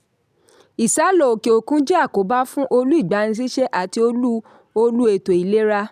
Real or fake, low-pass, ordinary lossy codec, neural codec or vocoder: real; 14.4 kHz; none; none